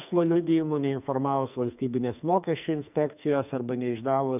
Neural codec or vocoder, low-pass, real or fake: codec, 44.1 kHz, 2.6 kbps, SNAC; 3.6 kHz; fake